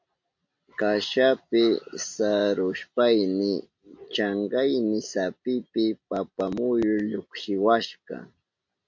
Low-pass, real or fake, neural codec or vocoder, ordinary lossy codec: 7.2 kHz; real; none; MP3, 48 kbps